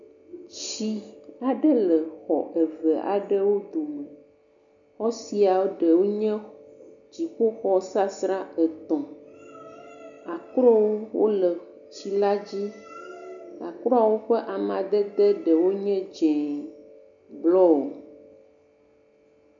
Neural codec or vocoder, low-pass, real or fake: none; 7.2 kHz; real